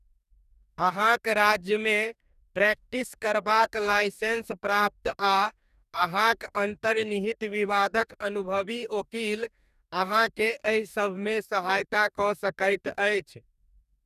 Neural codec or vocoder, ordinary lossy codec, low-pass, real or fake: codec, 44.1 kHz, 2.6 kbps, DAC; none; 14.4 kHz; fake